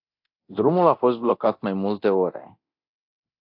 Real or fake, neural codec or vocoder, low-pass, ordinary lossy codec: fake; codec, 24 kHz, 0.9 kbps, DualCodec; 5.4 kHz; AAC, 48 kbps